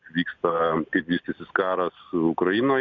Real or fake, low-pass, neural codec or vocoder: real; 7.2 kHz; none